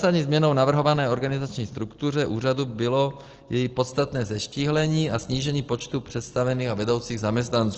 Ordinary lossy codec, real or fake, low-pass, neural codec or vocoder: Opus, 16 kbps; real; 7.2 kHz; none